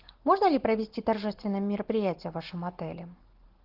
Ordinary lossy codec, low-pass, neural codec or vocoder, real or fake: Opus, 16 kbps; 5.4 kHz; none; real